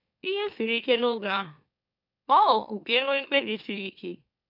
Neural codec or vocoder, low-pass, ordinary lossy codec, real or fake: autoencoder, 44.1 kHz, a latent of 192 numbers a frame, MeloTTS; 5.4 kHz; none; fake